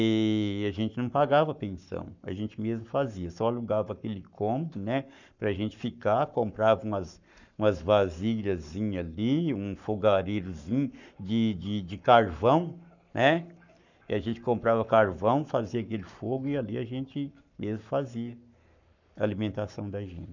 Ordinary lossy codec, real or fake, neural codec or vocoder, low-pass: none; fake; codec, 44.1 kHz, 7.8 kbps, Pupu-Codec; 7.2 kHz